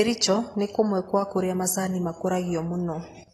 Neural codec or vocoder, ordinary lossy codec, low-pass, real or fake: none; AAC, 32 kbps; 19.8 kHz; real